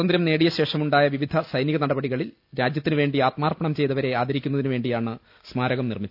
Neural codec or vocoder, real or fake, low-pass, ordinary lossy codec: none; real; 5.4 kHz; none